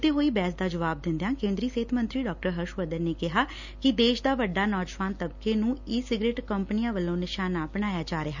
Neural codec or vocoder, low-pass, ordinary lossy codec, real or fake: none; 7.2 kHz; none; real